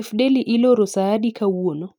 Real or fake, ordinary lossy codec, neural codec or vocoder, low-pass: real; none; none; 19.8 kHz